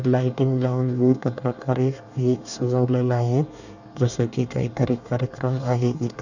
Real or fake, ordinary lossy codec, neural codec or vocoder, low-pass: fake; none; codec, 24 kHz, 1 kbps, SNAC; 7.2 kHz